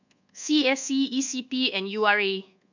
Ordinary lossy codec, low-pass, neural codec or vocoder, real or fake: none; 7.2 kHz; codec, 24 kHz, 1.2 kbps, DualCodec; fake